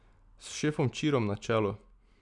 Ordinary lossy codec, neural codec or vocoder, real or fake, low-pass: none; none; real; 10.8 kHz